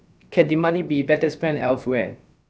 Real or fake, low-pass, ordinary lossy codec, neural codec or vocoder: fake; none; none; codec, 16 kHz, about 1 kbps, DyCAST, with the encoder's durations